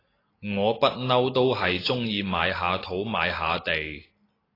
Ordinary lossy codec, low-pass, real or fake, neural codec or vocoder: AAC, 24 kbps; 5.4 kHz; real; none